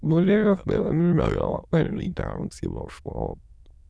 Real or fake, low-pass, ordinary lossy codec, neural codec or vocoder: fake; none; none; autoencoder, 22.05 kHz, a latent of 192 numbers a frame, VITS, trained on many speakers